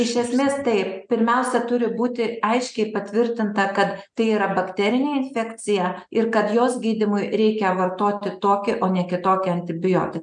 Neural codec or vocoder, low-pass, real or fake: none; 9.9 kHz; real